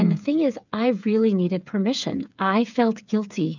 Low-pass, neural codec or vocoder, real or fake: 7.2 kHz; codec, 16 kHz, 8 kbps, FreqCodec, smaller model; fake